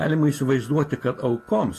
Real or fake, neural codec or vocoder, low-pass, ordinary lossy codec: real; none; 14.4 kHz; AAC, 48 kbps